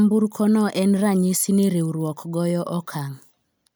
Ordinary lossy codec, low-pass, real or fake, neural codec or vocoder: none; none; real; none